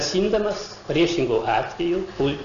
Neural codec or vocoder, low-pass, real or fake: none; 7.2 kHz; real